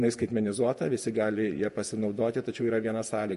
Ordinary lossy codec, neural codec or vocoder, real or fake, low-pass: MP3, 48 kbps; vocoder, 48 kHz, 128 mel bands, Vocos; fake; 14.4 kHz